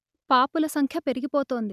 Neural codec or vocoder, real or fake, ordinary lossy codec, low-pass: none; real; none; 14.4 kHz